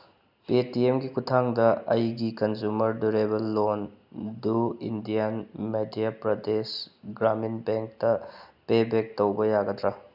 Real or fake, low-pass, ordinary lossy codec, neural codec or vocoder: real; 5.4 kHz; none; none